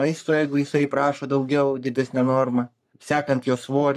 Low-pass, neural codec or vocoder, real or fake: 14.4 kHz; codec, 44.1 kHz, 3.4 kbps, Pupu-Codec; fake